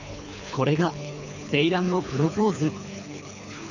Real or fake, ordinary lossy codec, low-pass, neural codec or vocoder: fake; none; 7.2 kHz; codec, 24 kHz, 3 kbps, HILCodec